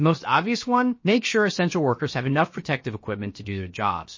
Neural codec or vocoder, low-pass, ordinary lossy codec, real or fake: codec, 16 kHz, about 1 kbps, DyCAST, with the encoder's durations; 7.2 kHz; MP3, 32 kbps; fake